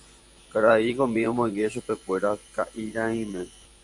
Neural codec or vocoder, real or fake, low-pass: vocoder, 24 kHz, 100 mel bands, Vocos; fake; 10.8 kHz